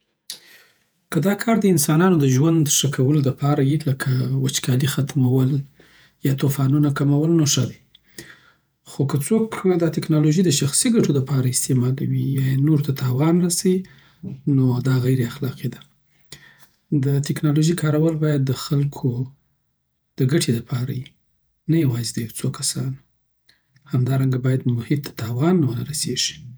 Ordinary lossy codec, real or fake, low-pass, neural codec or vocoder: none; fake; none; vocoder, 48 kHz, 128 mel bands, Vocos